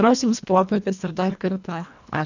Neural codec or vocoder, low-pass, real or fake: codec, 24 kHz, 1.5 kbps, HILCodec; 7.2 kHz; fake